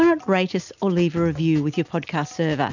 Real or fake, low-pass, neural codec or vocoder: real; 7.2 kHz; none